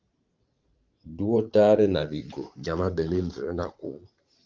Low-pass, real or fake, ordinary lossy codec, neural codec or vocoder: 7.2 kHz; fake; Opus, 24 kbps; vocoder, 44.1 kHz, 80 mel bands, Vocos